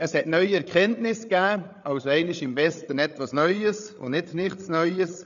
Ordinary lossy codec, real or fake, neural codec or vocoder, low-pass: none; fake; codec, 16 kHz, 8 kbps, FreqCodec, larger model; 7.2 kHz